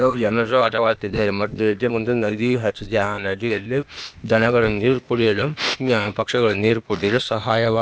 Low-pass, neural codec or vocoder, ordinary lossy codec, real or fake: none; codec, 16 kHz, 0.8 kbps, ZipCodec; none; fake